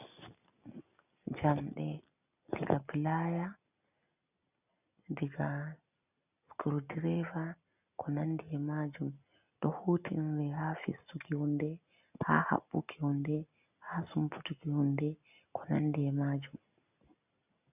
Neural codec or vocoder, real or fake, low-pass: none; real; 3.6 kHz